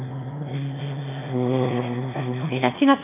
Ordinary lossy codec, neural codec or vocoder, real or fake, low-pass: none; autoencoder, 22.05 kHz, a latent of 192 numbers a frame, VITS, trained on one speaker; fake; 3.6 kHz